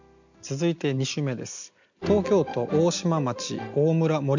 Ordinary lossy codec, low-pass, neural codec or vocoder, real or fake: none; 7.2 kHz; none; real